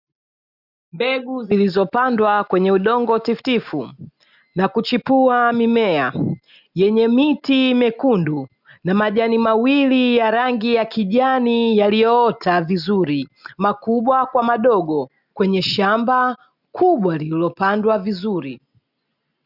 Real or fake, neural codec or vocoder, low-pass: real; none; 5.4 kHz